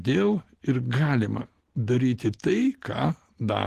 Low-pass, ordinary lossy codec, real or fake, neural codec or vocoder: 14.4 kHz; Opus, 16 kbps; real; none